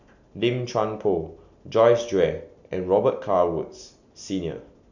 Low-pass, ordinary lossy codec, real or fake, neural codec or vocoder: 7.2 kHz; none; real; none